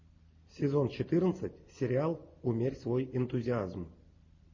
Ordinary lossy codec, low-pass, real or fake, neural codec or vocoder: MP3, 32 kbps; 7.2 kHz; real; none